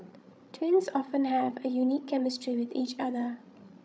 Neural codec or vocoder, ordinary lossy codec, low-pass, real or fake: codec, 16 kHz, 16 kbps, FreqCodec, larger model; none; none; fake